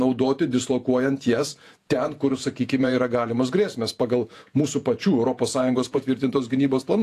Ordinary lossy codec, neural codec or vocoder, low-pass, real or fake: AAC, 64 kbps; vocoder, 48 kHz, 128 mel bands, Vocos; 14.4 kHz; fake